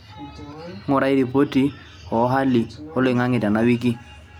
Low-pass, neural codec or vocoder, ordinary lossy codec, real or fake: 19.8 kHz; none; none; real